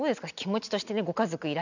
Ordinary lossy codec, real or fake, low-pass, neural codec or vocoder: none; real; 7.2 kHz; none